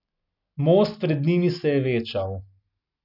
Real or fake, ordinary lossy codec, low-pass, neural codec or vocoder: real; none; 5.4 kHz; none